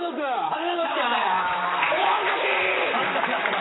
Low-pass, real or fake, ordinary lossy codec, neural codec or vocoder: 7.2 kHz; fake; AAC, 16 kbps; vocoder, 44.1 kHz, 128 mel bands, Pupu-Vocoder